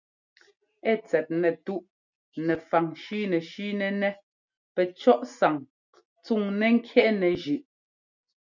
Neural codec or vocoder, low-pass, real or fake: none; 7.2 kHz; real